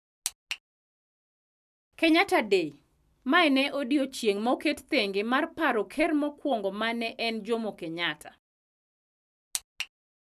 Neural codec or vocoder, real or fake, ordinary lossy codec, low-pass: none; real; none; 14.4 kHz